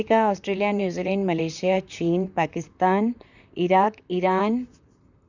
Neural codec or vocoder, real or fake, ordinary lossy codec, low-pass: vocoder, 44.1 kHz, 128 mel bands, Pupu-Vocoder; fake; none; 7.2 kHz